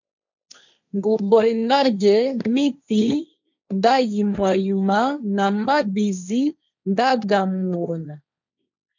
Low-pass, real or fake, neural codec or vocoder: 7.2 kHz; fake; codec, 16 kHz, 1.1 kbps, Voila-Tokenizer